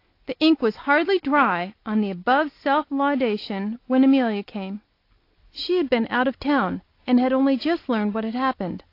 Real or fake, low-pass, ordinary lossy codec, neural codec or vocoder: real; 5.4 kHz; AAC, 32 kbps; none